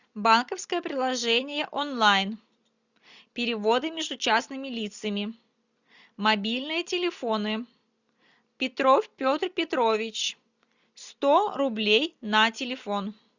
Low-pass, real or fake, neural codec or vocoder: 7.2 kHz; real; none